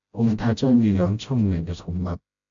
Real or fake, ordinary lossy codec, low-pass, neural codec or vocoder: fake; AAC, 64 kbps; 7.2 kHz; codec, 16 kHz, 0.5 kbps, FreqCodec, smaller model